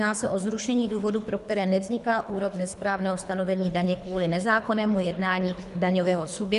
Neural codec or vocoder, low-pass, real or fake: codec, 24 kHz, 3 kbps, HILCodec; 10.8 kHz; fake